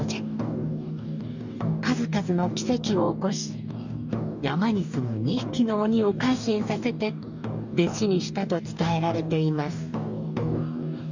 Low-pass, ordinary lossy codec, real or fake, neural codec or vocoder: 7.2 kHz; none; fake; codec, 44.1 kHz, 2.6 kbps, DAC